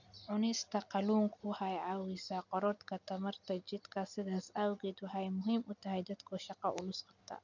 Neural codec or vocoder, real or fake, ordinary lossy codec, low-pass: none; real; none; 7.2 kHz